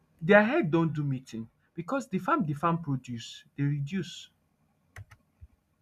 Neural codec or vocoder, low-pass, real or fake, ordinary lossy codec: none; 14.4 kHz; real; none